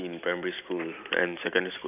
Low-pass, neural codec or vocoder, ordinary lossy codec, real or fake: 3.6 kHz; none; none; real